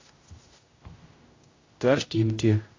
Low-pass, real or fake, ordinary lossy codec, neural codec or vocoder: 7.2 kHz; fake; AAC, 32 kbps; codec, 16 kHz, 0.5 kbps, X-Codec, HuBERT features, trained on general audio